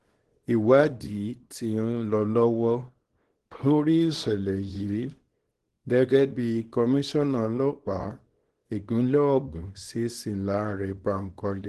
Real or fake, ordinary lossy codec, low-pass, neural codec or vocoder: fake; Opus, 16 kbps; 10.8 kHz; codec, 24 kHz, 0.9 kbps, WavTokenizer, small release